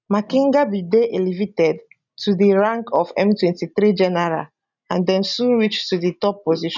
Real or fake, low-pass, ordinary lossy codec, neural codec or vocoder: real; 7.2 kHz; none; none